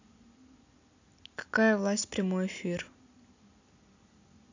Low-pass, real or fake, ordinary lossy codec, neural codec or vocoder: 7.2 kHz; real; AAC, 48 kbps; none